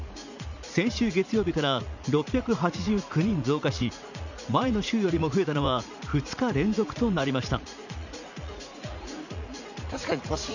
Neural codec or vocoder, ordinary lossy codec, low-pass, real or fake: vocoder, 44.1 kHz, 80 mel bands, Vocos; none; 7.2 kHz; fake